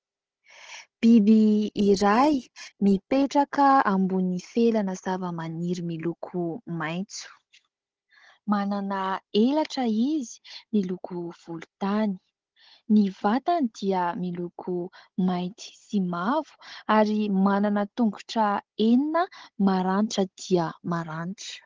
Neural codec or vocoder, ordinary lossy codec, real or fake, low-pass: codec, 16 kHz, 16 kbps, FunCodec, trained on Chinese and English, 50 frames a second; Opus, 16 kbps; fake; 7.2 kHz